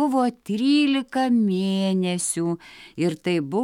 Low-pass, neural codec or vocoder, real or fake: 19.8 kHz; autoencoder, 48 kHz, 128 numbers a frame, DAC-VAE, trained on Japanese speech; fake